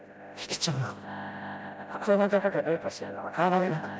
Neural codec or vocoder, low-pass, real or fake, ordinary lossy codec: codec, 16 kHz, 0.5 kbps, FreqCodec, smaller model; none; fake; none